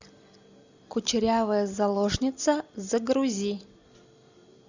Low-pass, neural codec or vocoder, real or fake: 7.2 kHz; none; real